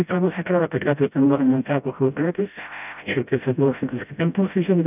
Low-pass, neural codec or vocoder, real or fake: 3.6 kHz; codec, 16 kHz, 0.5 kbps, FreqCodec, smaller model; fake